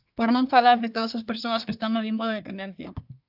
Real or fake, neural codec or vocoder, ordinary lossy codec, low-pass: fake; codec, 24 kHz, 1 kbps, SNAC; AAC, 48 kbps; 5.4 kHz